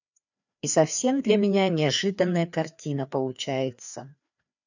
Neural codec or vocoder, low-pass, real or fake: codec, 16 kHz, 2 kbps, FreqCodec, larger model; 7.2 kHz; fake